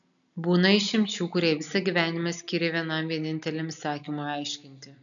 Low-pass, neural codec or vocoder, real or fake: 7.2 kHz; none; real